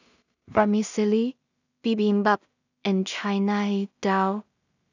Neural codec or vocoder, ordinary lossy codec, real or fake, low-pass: codec, 16 kHz in and 24 kHz out, 0.4 kbps, LongCat-Audio-Codec, two codebook decoder; none; fake; 7.2 kHz